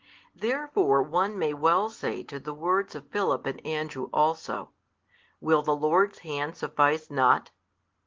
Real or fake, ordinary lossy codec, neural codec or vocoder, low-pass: real; Opus, 16 kbps; none; 7.2 kHz